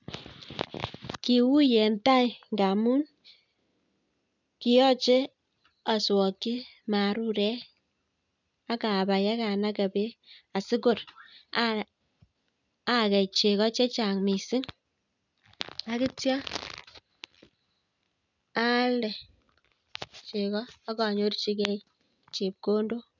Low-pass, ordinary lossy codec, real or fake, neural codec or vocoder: 7.2 kHz; none; real; none